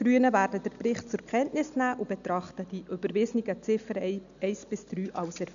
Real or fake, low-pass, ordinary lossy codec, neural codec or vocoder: real; 7.2 kHz; none; none